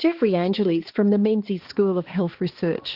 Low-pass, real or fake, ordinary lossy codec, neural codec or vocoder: 5.4 kHz; fake; Opus, 16 kbps; codec, 16 kHz, 2 kbps, X-Codec, HuBERT features, trained on balanced general audio